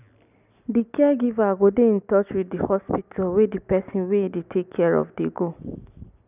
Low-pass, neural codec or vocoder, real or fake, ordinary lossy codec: 3.6 kHz; none; real; none